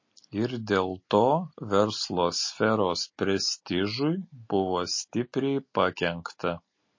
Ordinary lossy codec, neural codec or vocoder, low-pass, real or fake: MP3, 32 kbps; none; 7.2 kHz; real